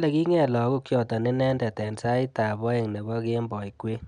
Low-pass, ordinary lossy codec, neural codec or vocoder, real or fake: 9.9 kHz; none; none; real